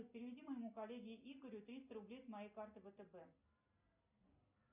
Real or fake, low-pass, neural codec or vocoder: real; 3.6 kHz; none